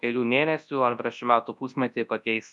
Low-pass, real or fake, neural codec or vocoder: 10.8 kHz; fake; codec, 24 kHz, 0.9 kbps, WavTokenizer, large speech release